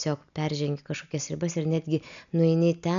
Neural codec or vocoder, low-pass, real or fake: none; 7.2 kHz; real